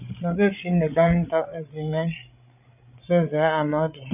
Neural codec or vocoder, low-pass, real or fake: codec, 16 kHz, 16 kbps, FreqCodec, larger model; 3.6 kHz; fake